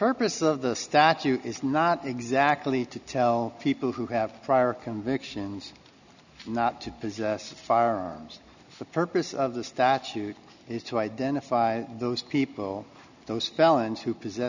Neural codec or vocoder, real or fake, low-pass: none; real; 7.2 kHz